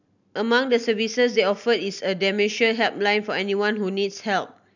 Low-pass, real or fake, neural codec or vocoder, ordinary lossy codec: 7.2 kHz; real; none; none